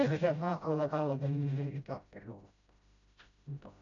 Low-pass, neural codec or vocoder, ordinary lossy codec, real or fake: 7.2 kHz; codec, 16 kHz, 0.5 kbps, FreqCodec, smaller model; none; fake